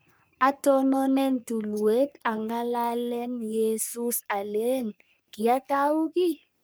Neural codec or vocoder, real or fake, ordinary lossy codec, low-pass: codec, 44.1 kHz, 3.4 kbps, Pupu-Codec; fake; none; none